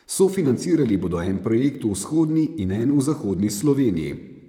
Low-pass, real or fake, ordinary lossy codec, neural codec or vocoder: 19.8 kHz; fake; none; vocoder, 44.1 kHz, 128 mel bands, Pupu-Vocoder